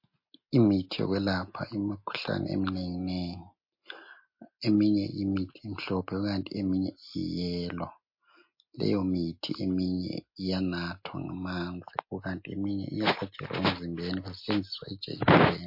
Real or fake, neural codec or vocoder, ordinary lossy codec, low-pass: real; none; MP3, 32 kbps; 5.4 kHz